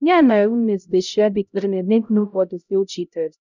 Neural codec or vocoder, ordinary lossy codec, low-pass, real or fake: codec, 16 kHz, 0.5 kbps, X-Codec, HuBERT features, trained on LibriSpeech; none; 7.2 kHz; fake